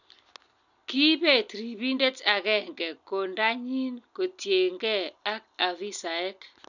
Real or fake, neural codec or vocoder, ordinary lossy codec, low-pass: real; none; none; 7.2 kHz